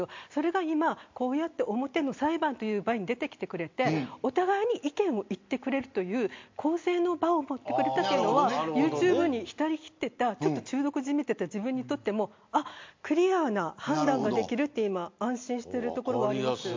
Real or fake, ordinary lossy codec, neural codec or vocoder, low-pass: real; MP3, 48 kbps; none; 7.2 kHz